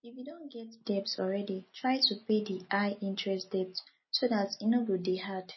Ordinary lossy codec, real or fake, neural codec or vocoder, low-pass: MP3, 24 kbps; real; none; 7.2 kHz